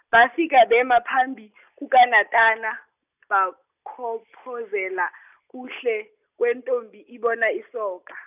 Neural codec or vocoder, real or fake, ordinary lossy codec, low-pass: none; real; none; 3.6 kHz